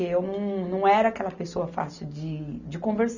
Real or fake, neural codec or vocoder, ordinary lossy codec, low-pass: real; none; none; 7.2 kHz